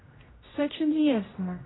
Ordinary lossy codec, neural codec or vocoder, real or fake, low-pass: AAC, 16 kbps; codec, 16 kHz, 0.5 kbps, X-Codec, HuBERT features, trained on general audio; fake; 7.2 kHz